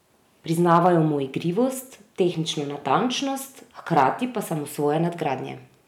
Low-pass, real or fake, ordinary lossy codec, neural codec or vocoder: 19.8 kHz; real; none; none